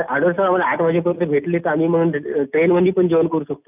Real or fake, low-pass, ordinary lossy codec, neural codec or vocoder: real; 3.6 kHz; none; none